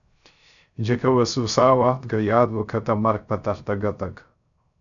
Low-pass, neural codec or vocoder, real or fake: 7.2 kHz; codec, 16 kHz, 0.3 kbps, FocalCodec; fake